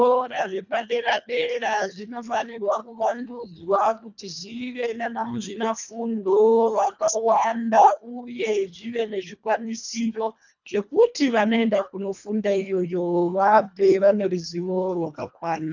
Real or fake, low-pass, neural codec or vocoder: fake; 7.2 kHz; codec, 24 kHz, 1.5 kbps, HILCodec